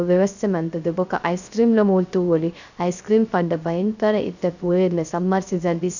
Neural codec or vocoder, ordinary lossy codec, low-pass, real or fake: codec, 16 kHz, 0.3 kbps, FocalCodec; none; 7.2 kHz; fake